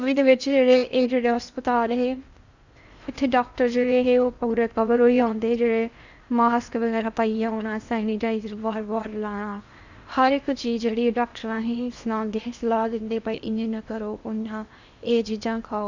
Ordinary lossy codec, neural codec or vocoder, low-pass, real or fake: Opus, 64 kbps; codec, 16 kHz in and 24 kHz out, 0.8 kbps, FocalCodec, streaming, 65536 codes; 7.2 kHz; fake